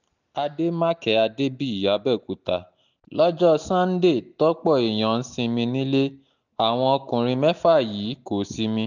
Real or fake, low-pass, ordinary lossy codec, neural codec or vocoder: real; 7.2 kHz; none; none